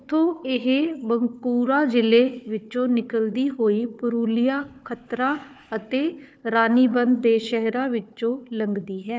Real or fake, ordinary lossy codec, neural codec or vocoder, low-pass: fake; none; codec, 16 kHz, 4 kbps, FunCodec, trained on Chinese and English, 50 frames a second; none